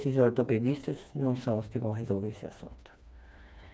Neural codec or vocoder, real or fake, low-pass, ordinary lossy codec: codec, 16 kHz, 2 kbps, FreqCodec, smaller model; fake; none; none